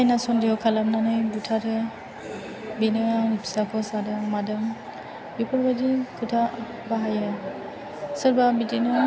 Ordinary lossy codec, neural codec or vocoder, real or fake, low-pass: none; none; real; none